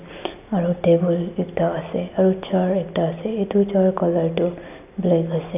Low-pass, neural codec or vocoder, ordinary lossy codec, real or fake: 3.6 kHz; none; none; real